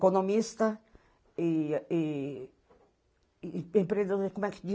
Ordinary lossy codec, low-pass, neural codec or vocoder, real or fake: none; none; none; real